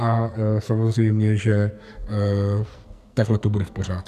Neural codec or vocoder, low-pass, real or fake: codec, 44.1 kHz, 2.6 kbps, SNAC; 14.4 kHz; fake